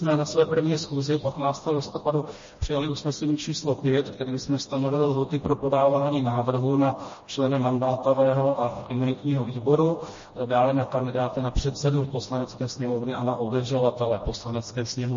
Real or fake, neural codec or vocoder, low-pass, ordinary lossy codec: fake; codec, 16 kHz, 1 kbps, FreqCodec, smaller model; 7.2 kHz; MP3, 32 kbps